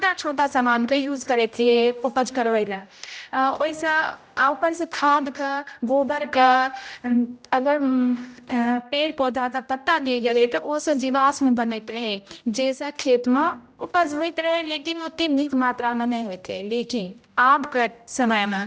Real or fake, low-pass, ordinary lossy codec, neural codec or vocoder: fake; none; none; codec, 16 kHz, 0.5 kbps, X-Codec, HuBERT features, trained on general audio